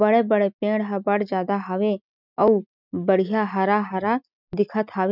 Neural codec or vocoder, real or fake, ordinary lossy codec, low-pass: none; real; none; 5.4 kHz